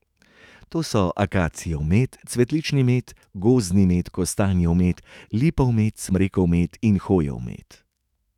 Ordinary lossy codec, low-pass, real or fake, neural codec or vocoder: none; 19.8 kHz; fake; autoencoder, 48 kHz, 128 numbers a frame, DAC-VAE, trained on Japanese speech